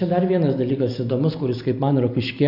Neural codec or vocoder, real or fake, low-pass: none; real; 5.4 kHz